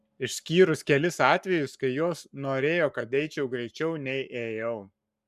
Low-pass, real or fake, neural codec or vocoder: 14.4 kHz; fake; codec, 44.1 kHz, 7.8 kbps, Pupu-Codec